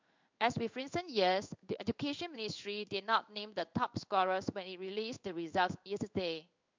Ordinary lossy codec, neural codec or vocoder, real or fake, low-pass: MP3, 64 kbps; codec, 16 kHz in and 24 kHz out, 1 kbps, XY-Tokenizer; fake; 7.2 kHz